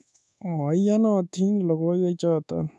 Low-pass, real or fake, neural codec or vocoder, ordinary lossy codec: none; fake; codec, 24 kHz, 1.2 kbps, DualCodec; none